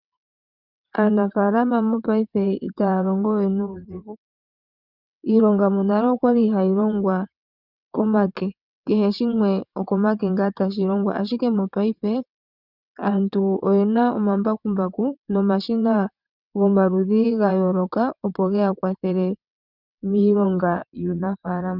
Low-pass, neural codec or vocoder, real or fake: 5.4 kHz; vocoder, 22.05 kHz, 80 mel bands, WaveNeXt; fake